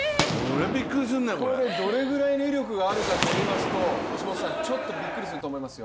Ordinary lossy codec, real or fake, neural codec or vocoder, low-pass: none; real; none; none